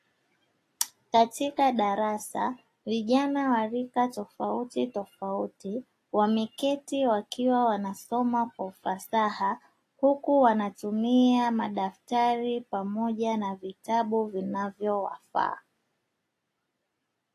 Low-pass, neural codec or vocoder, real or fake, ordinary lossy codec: 14.4 kHz; none; real; AAC, 48 kbps